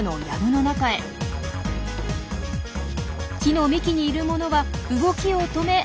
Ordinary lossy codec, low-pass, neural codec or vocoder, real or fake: none; none; none; real